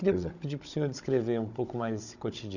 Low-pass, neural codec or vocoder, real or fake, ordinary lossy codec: 7.2 kHz; codec, 16 kHz, 16 kbps, FunCodec, trained on Chinese and English, 50 frames a second; fake; none